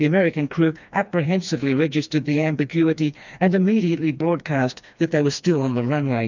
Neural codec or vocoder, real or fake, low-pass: codec, 16 kHz, 2 kbps, FreqCodec, smaller model; fake; 7.2 kHz